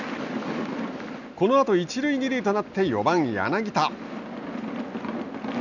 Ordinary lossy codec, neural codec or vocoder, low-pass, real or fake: none; none; 7.2 kHz; real